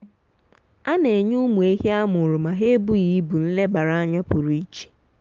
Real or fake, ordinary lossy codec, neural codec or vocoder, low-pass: real; Opus, 24 kbps; none; 7.2 kHz